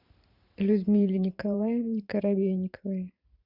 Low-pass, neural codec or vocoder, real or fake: 5.4 kHz; vocoder, 44.1 kHz, 128 mel bands every 512 samples, BigVGAN v2; fake